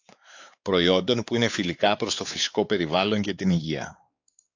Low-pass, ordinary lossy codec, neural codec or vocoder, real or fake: 7.2 kHz; AAC, 48 kbps; codec, 16 kHz, 4 kbps, X-Codec, WavLM features, trained on Multilingual LibriSpeech; fake